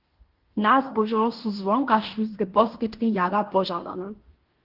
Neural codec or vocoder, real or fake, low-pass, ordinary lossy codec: codec, 16 kHz in and 24 kHz out, 0.9 kbps, LongCat-Audio-Codec, fine tuned four codebook decoder; fake; 5.4 kHz; Opus, 16 kbps